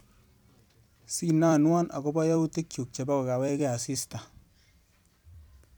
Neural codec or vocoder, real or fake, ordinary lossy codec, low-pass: vocoder, 44.1 kHz, 128 mel bands every 256 samples, BigVGAN v2; fake; none; none